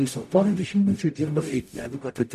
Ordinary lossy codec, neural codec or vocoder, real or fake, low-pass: MP3, 96 kbps; codec, 44.1 kHz, 0.9 kbps, DAC; fake; 14.4 kHz